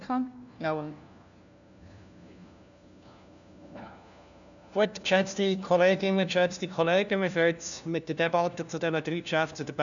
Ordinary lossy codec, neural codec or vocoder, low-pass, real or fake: none; codec, 16 kHz, 1 kbps, FunCodec, trained on LibriTTS, 50 frames a second; 7.2 kHz; fake